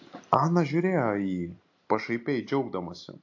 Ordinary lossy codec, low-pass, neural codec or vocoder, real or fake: AAC, 48 kbps; 7.2 kHz; none; real